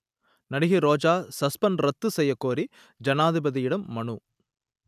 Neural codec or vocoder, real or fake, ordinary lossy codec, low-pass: none; real; none; 14.4 kHz